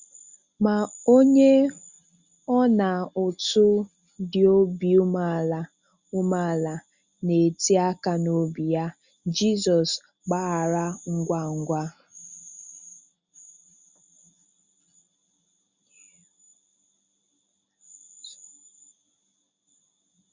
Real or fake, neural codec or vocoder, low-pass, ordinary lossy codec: real; none; 7.2 kHz; Opus, 64 kbps